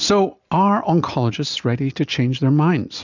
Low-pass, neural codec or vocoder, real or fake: 7.2 kHz; none; real